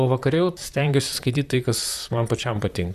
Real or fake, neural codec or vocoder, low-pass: fake; vocoder, 44.1 kHz, 128 mel bands, Pupu-Vocoder; 14.4 kHz